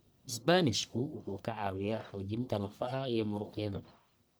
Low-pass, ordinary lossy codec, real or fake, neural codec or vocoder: none; none; fake; codec, 44.1 kHz, 1.7 kbps, Pupu-Codec